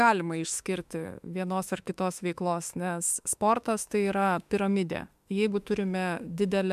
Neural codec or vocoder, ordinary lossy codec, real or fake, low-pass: autoencoder, 48 kHz, 32 numbers a frame, DAC-VAE, trained on Japanese speech; MP3, 96 kbps; fake; 14.4 kHz